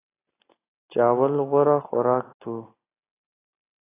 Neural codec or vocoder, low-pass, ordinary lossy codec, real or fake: none; 3.6 kHz; AAC, 16 kbps; real